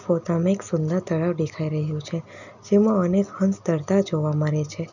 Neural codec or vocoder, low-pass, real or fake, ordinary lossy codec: none; 7.2 kHz; real; none